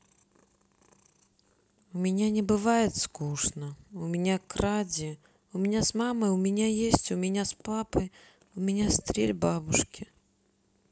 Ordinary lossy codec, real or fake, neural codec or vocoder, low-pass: none; real; none; none